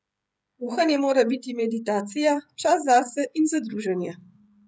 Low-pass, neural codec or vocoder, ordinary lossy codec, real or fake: none; codec, 16 kHz, 16 kbps, FreqCodec, smaller model; none; fake